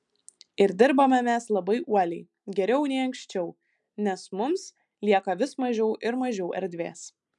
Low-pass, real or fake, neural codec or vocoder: 10.8 kHz; real; none